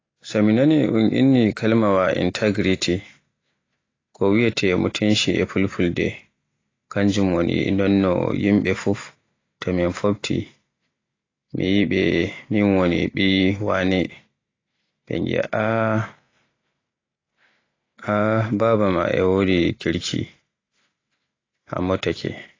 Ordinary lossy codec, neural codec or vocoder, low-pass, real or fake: AAC, 32 kbps; none; 7.2 kHz; real